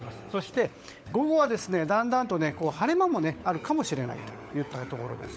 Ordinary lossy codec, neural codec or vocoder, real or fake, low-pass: none; codec, 16 kHz, 16 kbps, FunCodec, trained on LibriTTS, 50 frames a second; fake; none